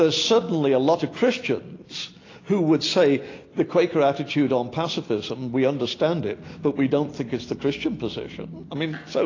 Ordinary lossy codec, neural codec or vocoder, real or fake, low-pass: AAC, 32 kbps; none; real; 7.2 kHz